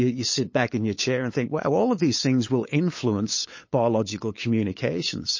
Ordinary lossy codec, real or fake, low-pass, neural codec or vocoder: MP3, 32 kbps; fake; 7.2 kHz; codec, 16 kHz, 8 kbps, FunCodec, trained on LibriTTS, 25 frames a second